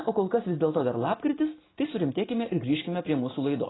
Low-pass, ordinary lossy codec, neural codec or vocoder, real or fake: 7.2 kHz; AAC, 16 kbps; none; real